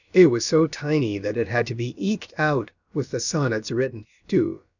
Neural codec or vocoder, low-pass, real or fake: codec, 16 kHz, about 1 kbps, DyCAST, with the encoder's durations; 7.2 kHz; fake